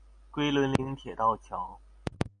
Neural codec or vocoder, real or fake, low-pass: none; real; 9.9 kHz